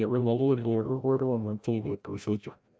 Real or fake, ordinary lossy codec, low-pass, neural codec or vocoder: fake; none; none; codec, 16 kHz, 0.5 kbps, FreqCodec, larger model